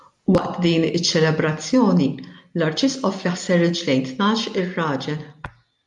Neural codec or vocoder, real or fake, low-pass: none; real; 10.8 kHz